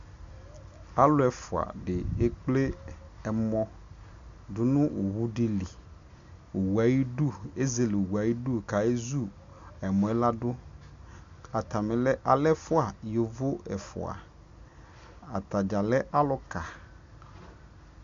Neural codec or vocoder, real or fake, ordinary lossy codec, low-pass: none; real; MP3, 64 kbps; 7.2 kHz